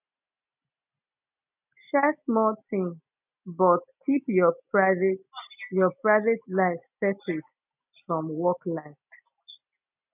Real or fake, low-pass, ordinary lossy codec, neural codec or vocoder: real; 3.6 kHz; none; none